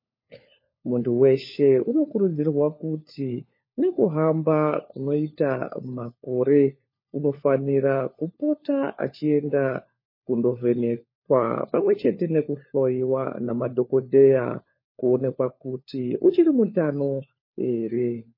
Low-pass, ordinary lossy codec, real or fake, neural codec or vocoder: 5.4 kHz; MP3, 24 kbps; fake; codec, 16 kHz, 4 kbps, FunCodec, trained on LibriTTS, 50 frames a second